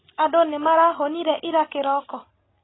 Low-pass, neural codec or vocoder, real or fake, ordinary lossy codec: 7.2 kHz; none; real; AAC, 16 kbps